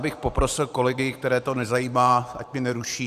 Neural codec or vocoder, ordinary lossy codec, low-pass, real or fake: vocoder, 44.1 kHz, 128 mel bands every 512 samples, BigVGAN v2; Opus, 64 kbps; 14.4 kHz; fake